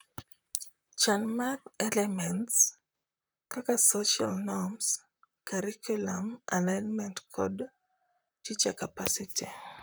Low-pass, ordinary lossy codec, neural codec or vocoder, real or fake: none; none; vocoder, 44.1 kHz, 128 mel bands, Pupu-Vocoder; fake